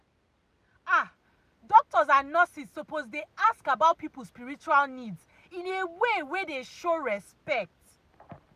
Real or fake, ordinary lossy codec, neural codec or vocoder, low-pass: real; none; none; 14.4 kHz